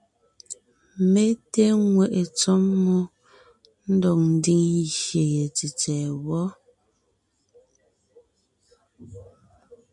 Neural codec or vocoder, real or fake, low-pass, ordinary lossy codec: none; real; 10.8 kHz; MP3, 96 kbps